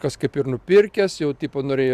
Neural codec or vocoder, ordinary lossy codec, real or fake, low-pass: none; Opus, 64 kbps; real; 14.4 kHz